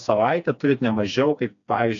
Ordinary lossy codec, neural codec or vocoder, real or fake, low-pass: AAC, 64 kbps; codec, 16 kHz, 2 kbps, FreqCodec, smaller model; fake; 7.2 kHz